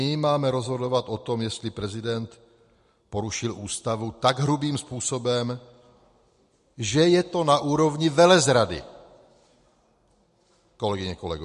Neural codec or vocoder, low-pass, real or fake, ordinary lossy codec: none; 14.4 kHz; real; MP3, 48 kbps